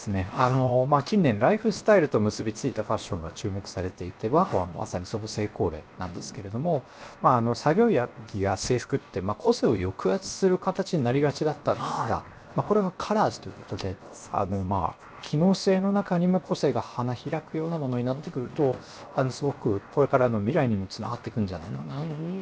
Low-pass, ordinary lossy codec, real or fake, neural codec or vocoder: none; none; fake; codec, 16 kHz, 0.7 kbps, FocalCodec